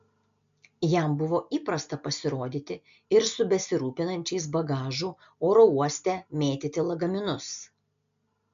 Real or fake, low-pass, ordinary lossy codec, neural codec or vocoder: real; 7.2 kHz; MP3, 64 kbps; none